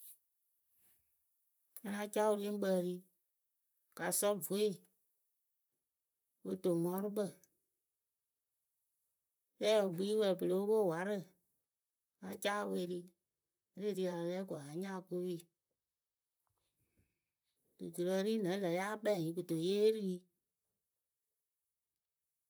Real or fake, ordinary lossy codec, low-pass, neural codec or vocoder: fake; none; none; vocoder, 44.1 kHz, 128 mel bands, Pupu-Vocoder